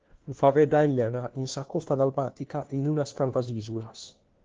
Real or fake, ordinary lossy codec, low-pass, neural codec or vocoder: fake; Opus, 16 kbps; 7.2 kHz; codec, 16 kHz, 1 kbps, FunCodec, trained on LibriTTS, 50 frames a second